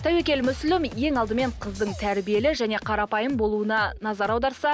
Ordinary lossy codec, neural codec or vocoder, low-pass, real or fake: none; none; none; real